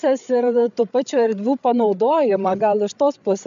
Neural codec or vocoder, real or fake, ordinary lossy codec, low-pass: codec, 16 kHz, 8 kbps, FreqCodec, larger model; fake; AAC, 96 kbps; 7.2 kHz